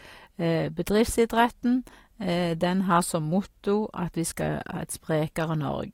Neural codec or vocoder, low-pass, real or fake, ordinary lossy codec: none; 19.8 kHz; real; AAC, 48 kbps